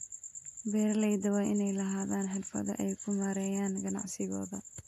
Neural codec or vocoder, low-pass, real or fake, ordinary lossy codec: none; 14.4 kHz; real; AAC, 64 kbps